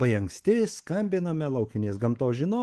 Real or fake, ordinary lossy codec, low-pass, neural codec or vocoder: fake; Opus, 16 kbps; 10.8 kHz; codec, 24 kHz, 3.1 kbps, DualCodec